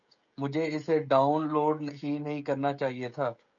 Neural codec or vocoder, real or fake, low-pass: codec, 16 kHz, 16 kbps, FreqCodec, smaller model; fake; 7.2 kHz